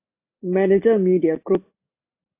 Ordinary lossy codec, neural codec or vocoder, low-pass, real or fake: AAC, 32 kbps; none; 3.6 kHz; real